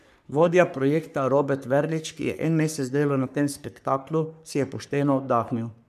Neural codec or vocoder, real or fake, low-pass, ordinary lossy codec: codec, 44.1 kHz, 3.4 kbps, Pupu-Codec; fake; 14.4 kHz; none